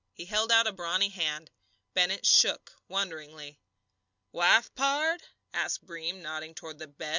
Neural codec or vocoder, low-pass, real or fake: none; 7.2 kHz; real